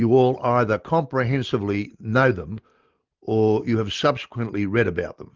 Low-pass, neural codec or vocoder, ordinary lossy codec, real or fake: 7.2 kHz; none; Opus, 32 kbps; real